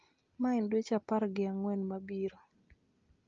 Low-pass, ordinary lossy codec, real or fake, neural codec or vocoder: 7.2 kHz; Opus, 24 kbps; real; none